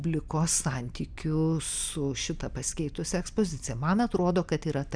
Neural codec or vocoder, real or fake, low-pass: none; real; 9.9 kHz